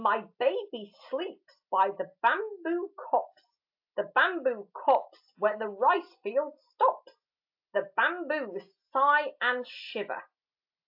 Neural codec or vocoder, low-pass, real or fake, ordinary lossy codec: none; 5.4 kHz; real; AAC, 48 kbps